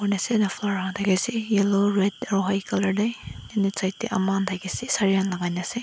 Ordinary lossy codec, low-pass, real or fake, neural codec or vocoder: none; none; real; none